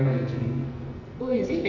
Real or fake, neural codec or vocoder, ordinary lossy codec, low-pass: fake; codec, 44.1 kHz, 2.6 kbps, SNAC; none; 7.2 kHz